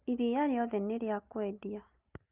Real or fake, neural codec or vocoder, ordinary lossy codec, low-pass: real; none; Opus, 24 kbps; 3.6 kHz